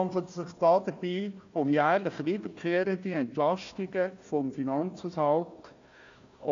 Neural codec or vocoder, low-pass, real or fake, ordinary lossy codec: codec, 16 kHz, 1 kbps, FunCodec, trained on Chinese and English, 50 frames a second; 7.2 kHz; fake; AAC, 64 kbps